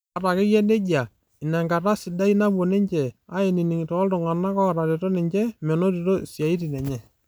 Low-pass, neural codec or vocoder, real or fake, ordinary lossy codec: none; none; real; none